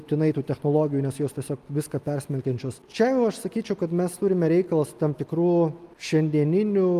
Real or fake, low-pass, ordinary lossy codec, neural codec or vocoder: real; 14.4 kHz; Opus, 32 kbps; none